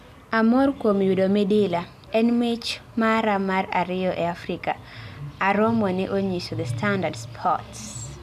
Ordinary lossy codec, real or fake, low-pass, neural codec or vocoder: MP3, 96 kbps; real; 14.4 kHz; none